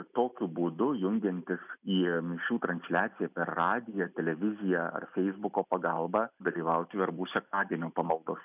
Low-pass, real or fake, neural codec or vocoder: 3.6 kHz; real; none